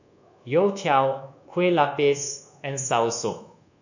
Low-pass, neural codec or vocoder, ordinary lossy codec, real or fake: 7.2 kHz; codec, 24 kHz, 1.2 kbps, DualCodec; none; fake